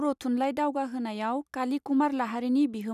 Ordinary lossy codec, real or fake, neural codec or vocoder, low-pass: none; real; none; 14.4 kHz